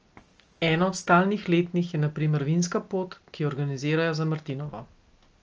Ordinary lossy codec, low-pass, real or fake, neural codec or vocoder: Opus, 24 kbps; 7.2 kHz; real; none